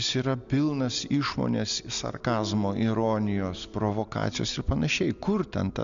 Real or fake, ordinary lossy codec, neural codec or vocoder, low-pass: real; Opus, 64 kbps; none; 7.2 kHz